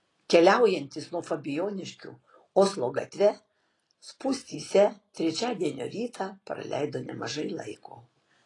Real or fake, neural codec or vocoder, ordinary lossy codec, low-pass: real; none; AAC, 32 kbps; 10.8 kHz